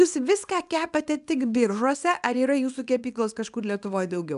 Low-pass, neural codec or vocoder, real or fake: 10.8 kHz; codec, 24 kHz, 0.9 kbps, WavTokenizer, small release; fake